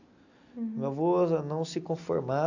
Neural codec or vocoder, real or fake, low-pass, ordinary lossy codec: none; real; 7.2 kHz; AAC, 48 kbps